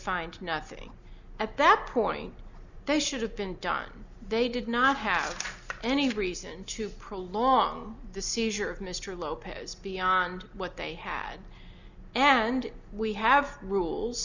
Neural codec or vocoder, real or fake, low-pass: none; real; 7.2 kHz